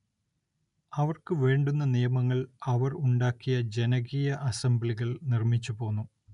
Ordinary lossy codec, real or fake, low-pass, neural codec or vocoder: none; real; 10.8 kHz; none